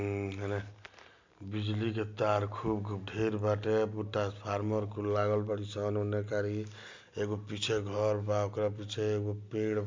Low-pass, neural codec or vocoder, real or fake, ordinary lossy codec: 7.2 kHz; none; real; MP3, 64 kbps